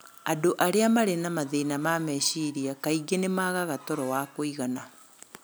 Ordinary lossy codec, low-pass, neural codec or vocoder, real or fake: none; none; none; real